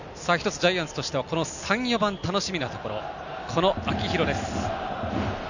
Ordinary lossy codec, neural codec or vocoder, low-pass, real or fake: none; none; 7.2 kHz; real